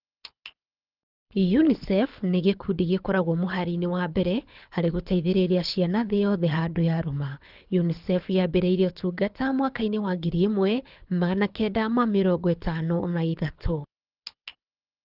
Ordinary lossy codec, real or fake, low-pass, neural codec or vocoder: Opus, 24 kbps; fake; 5.4 kHz; codec, 24 kHz, 6 kbps, HILCodec